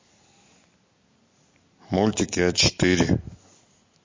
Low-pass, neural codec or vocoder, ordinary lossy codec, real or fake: 7.2 kHz; none; MP3, 32 kbps; real